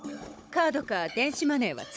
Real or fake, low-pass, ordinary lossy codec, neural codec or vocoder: fake; none; none; codec, 16 kHz, 16 kbps, FunCodec, trained on Chinese and English, 50 frames a second